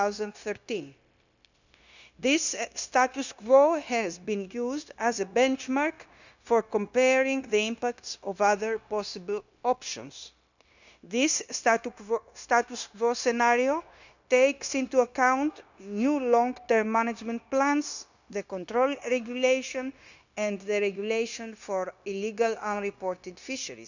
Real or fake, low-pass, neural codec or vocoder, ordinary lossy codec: fake; 7.2 kHz; codec, 16 kHz, 0.9 kbps, LongCat-Audio-Codec; none